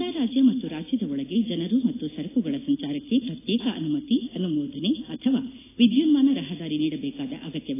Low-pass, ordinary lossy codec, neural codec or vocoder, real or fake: 3.6 kHz; AAC, 16 kbps; none; real